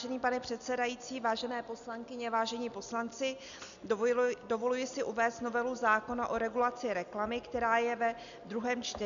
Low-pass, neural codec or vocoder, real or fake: 7.2 kHz; none; real